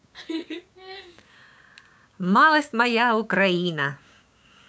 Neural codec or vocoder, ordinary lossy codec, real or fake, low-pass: codec, 16 kHz, 6 kbps, DAC; none; fake; none